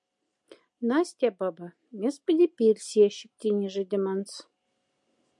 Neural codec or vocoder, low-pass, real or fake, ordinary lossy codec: none; 10.8 kHz; real; MP3, 64 kbps